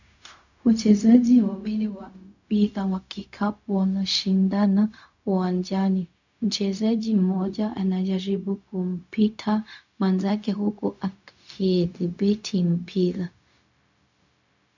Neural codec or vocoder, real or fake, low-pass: codec, 16 kHz, 0.4 kbps, LongCat-Audio-Codec; fake; 7.2 kHz